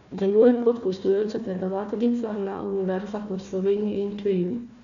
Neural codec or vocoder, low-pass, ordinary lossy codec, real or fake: codec, 16 kHz, 1 kbps, FunCodec, trained on Chinese and English, 50 frames a second; 7.2 kHz; none; fake